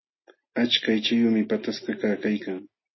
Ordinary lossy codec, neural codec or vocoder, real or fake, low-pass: MP3, 24 kbps; none; real; 7.2 kHz